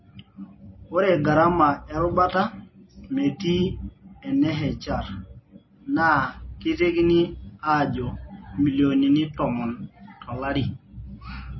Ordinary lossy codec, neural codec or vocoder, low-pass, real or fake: MP3, 24 kbps; none; 7.2 kHz; real